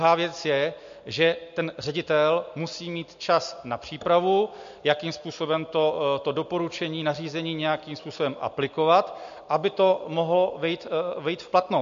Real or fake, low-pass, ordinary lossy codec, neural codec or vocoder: real; 7.2 kHz; MP3, 48 kbps; none